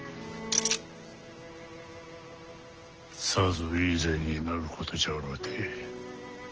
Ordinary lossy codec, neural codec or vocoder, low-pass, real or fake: Opus, 16 kbps; none; 7.2 kHz; real